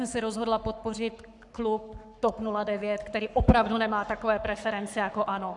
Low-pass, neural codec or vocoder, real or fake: 10.8 kHz; codec, 44.1 kHz, 7.8 kbps, Pupu-Codec; fake